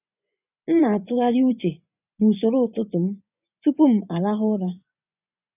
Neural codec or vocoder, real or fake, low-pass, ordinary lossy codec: vocoder, 24 kHz, 100 mel bands, Vocos; fake; 3.6 kHz; none